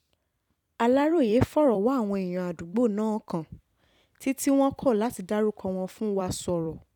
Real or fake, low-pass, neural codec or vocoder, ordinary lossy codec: fake; 19.8 kHz; vocoder, 44.1 kHz, 128 mel bands every 256 samples, BigVGAN v2; none